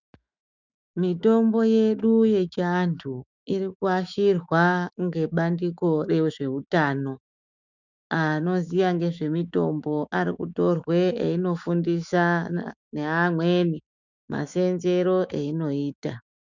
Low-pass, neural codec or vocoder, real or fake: 7.2 kHz; autoencoder, 48 kHz, 128 numbers a frame, DAC-VAE, trained on Japanese speech; fake